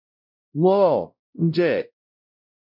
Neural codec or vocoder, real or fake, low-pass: codec, 16 kHz, 0.5 kbps, X-Codec, WavLM features, trained on Multilingual LibriSpeech; fake; 5.4 kHz